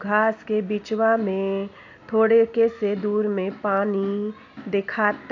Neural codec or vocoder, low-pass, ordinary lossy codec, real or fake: none; 7.2 kHz; AAC, 48 kbps; real